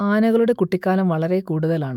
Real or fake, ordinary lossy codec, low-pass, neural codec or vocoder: fake; none; 19.8 kHz; autoencoder, 48 kHz, 128 numbers a frame, DAC-VAE, trained on Japanese speech